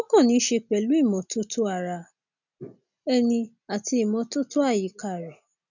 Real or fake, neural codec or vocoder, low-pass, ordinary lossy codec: real; none; 7.2 kHz; none